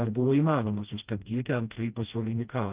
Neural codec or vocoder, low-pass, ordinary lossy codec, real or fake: codec, 16 kHz, 1 kbps, FreqCodec, smaller model; 3.6 kHz; Opus, 32 kbps; fake